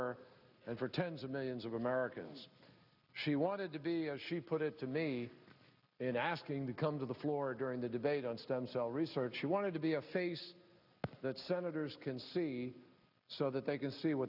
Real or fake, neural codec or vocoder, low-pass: real; none; 5.4 kHz